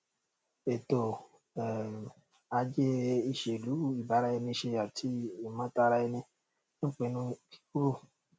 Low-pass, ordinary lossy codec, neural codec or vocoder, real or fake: none; none; none; real